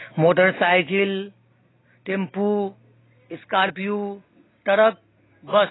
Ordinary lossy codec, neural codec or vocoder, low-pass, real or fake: AAC, 16 kbps; none; 7.2 kHz; real